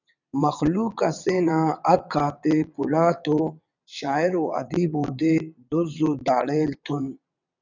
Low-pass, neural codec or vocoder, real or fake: 7.2 kHz; vocoder, 22.05 kHz, 80 mel bands, WaveNeXt; fake